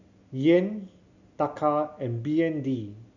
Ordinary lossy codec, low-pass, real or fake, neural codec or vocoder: none; 7.2 kHz; real; none